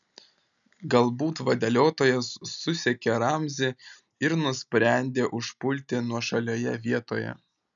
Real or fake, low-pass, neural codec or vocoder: real; 7.2 kHz; none